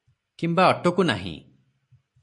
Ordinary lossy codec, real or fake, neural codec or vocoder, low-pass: MP3, 48 kbps; real; none; 10.8 kHz